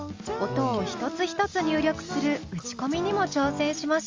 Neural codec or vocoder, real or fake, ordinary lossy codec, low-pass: none; real; Opus, 32 kbps; 7.2 kHz